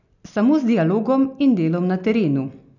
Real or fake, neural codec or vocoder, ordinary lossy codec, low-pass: real; none; none; 7.2 kHz